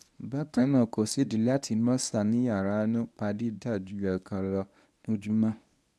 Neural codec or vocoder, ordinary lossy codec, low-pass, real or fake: codec, 24 kHz, 0.9 kbps, WavTokenizer, medium speech release version 1; none; none; fake